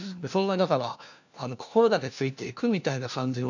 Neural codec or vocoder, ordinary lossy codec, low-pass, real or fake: codec, 16 kHz, 1 kbps, FunCodec, trained on LibriTTS, 50 frames a second; none; 7.2 kHz; fake